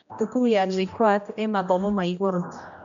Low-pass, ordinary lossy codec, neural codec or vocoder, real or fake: 7.2 kHz; none; codec, 16 kHz, 1 kbps, X-Codec, HuBERT features, trained on general audio; fake